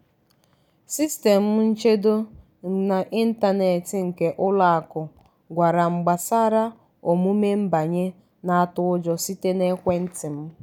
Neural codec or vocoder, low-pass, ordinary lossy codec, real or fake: none; 19.8 kHz; none; real